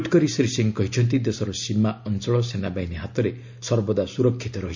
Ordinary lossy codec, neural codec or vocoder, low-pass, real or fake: MP3, 64 kbps; none; 7.2 kHz; real